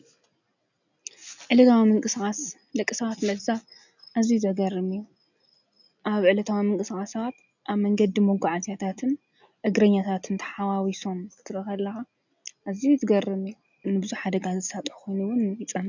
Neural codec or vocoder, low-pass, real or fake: none; 7.2 kHz; real